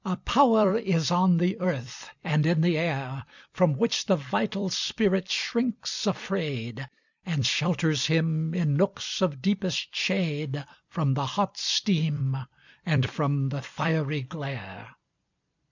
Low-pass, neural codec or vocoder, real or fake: 7.2 kHz; vocoder, 44.1 kHz, 128 mel bands every 256 samples, BigVGAN v2; fake